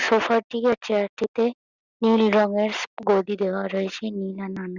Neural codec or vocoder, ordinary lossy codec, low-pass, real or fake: none; Opus, 64 kbps; 7.2 kHz; real